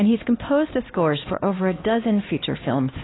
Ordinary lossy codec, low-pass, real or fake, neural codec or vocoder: AAC, 16 kbps; 7.2 kHz; fake; codec, 16 kHz, 2 kbps, X-Codec, HuBERT features, trained on LibriSpeech